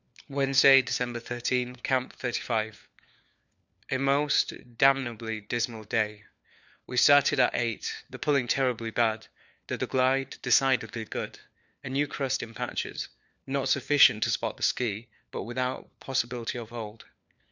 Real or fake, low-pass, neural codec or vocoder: fake; 7.2 kHz; codec, 16 kHz, 4 kbps, FunCodec, trained on LibriTTS, 50 frames a second